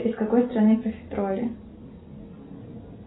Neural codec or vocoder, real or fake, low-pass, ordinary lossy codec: none; real; 7.2 kHz; AAC, 16 kbps